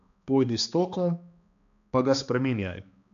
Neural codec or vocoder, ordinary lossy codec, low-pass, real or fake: codec, 16 kHz, 2 kbps, X-Codec, HuBERT features, trained on balanced general audio; none; 7.2 kHz; fake